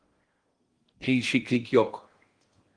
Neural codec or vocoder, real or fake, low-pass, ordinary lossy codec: codec, 16 kHz in and 24 kHz out, 0.6 kbps, FocalCodec, streaming, 4096 codes; fake; 9.9 kHz; Opus, 24 kbps